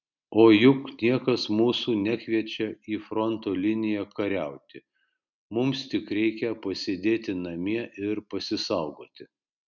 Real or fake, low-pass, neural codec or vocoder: real; 7.2 kHz; none